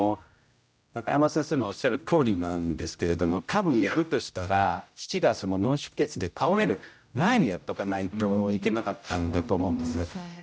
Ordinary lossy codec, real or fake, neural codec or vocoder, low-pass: none; fake; codec, 16 kHz, 0.5 kbps, X-Codec, HuBERT features, trained on general audio; none